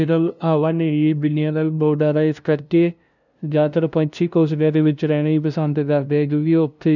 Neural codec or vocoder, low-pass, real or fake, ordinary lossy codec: codec, 16 kHz, 0.5 kbps, FunCodec, trained on LibriTTS, 25 frames a second; 7.2 kHz; fake; none